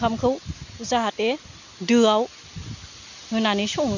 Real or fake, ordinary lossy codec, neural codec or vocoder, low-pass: real; none; none; 7.2 kHz